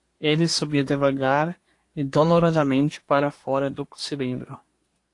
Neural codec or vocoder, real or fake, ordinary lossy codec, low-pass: codec, 24 kHz, 1 kbps, SNAC; fake; AAC, 48 kbps; 10.8 kHz